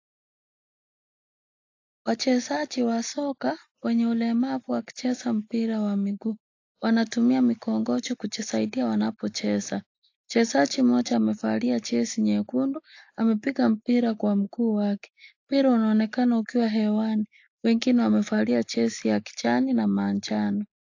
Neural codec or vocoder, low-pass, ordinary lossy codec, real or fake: none; 7.2 kHz; AAC, 48 kbps; real